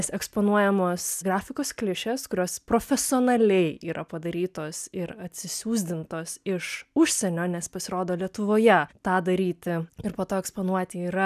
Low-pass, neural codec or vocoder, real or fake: 14.4 kHz; none; real